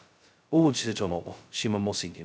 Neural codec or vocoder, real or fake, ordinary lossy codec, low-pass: codec, 16 kHz, 0.2 kbps, FocalCodec; fake; none; none